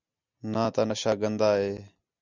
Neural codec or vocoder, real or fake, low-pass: none; real; 7.2 kHz